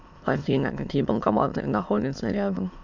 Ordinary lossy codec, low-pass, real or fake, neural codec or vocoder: MP3, 64 kbps; 7.2 kHz; fake; autoencoder, 22.05 kHz, a latent of 192 numbers a frame, VITS, trained on many speakers